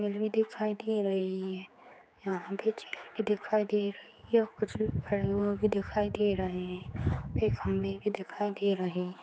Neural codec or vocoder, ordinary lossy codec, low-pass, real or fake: codec, 16 kHz, 4 kbps, X-Codec, HuBERT features, trained on general audio; none; none; fake